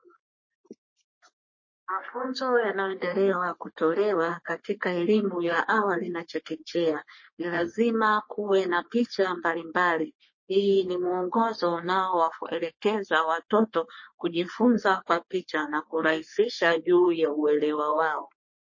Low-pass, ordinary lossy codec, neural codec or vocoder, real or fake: 7.2 kHz; MP3, 32 kbps; codec, 44.1 kHz, 3.4 kbps, Pupu-Codec; fake